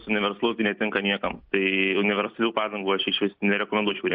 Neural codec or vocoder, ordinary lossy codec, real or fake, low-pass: none; Opus, 64 kbps; real; 5.4 kHz